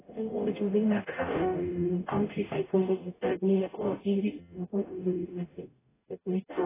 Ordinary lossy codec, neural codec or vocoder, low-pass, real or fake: AAC, 16 kbps; codec, 44.1 kHz, 0.9 kbps, DAC; 3.6 kHz; fake